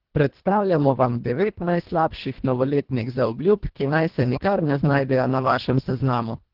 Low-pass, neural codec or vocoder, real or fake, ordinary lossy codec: 5.4 kHz; codec, 24 kHz, 1.5 kbps, HILCodec; fake; Opus, 16 kbps